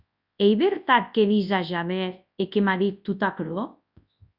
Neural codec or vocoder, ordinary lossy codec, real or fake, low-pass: codec, 24 kHz, 0.9 kbps, WavTokenizer, large speech release; Opus, 64 kbps; fake; 5.4 kHz